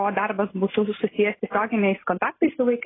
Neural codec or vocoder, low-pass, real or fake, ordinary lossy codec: none; 7.2 kHz; real; AAC, 16 kbps